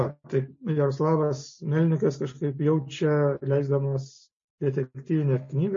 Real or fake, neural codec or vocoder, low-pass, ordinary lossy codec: real; none; 7.2 kHz; MP3, 32 kbps